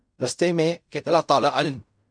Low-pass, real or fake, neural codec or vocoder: 9.9 kHz; fake; codec, 16 kHz in and 24 kHz out, 0.4 kbps, LongCat-Audio-Codec, fine tuned four codebook decoder